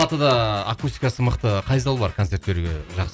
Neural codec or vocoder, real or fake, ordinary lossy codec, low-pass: none; real; none; none